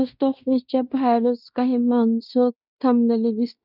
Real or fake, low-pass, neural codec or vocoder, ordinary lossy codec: fake; 5.4 kHz; codec, 16 kHz in and 24 kHz out, 0.9 kbps, LongCat-Audio-Codec, fine tuned four codebook decoder; none